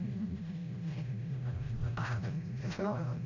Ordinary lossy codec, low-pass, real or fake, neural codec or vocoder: none; 7.2 kHz; fake; codec, 16 kHz, 0.5 kbps, FreqCodec, smaller model